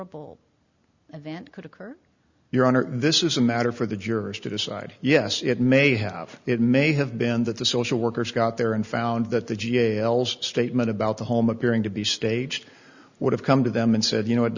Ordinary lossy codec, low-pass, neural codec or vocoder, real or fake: Opus, 64 kbps; 7.2 kHz; none; real